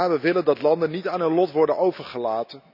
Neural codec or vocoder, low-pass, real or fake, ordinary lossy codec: none; 5.4 kHz; real; none